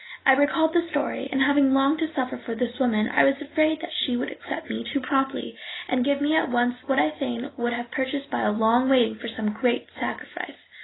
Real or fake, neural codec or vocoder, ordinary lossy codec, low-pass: real; none; AAC, 16 kbps; 7.2 kHz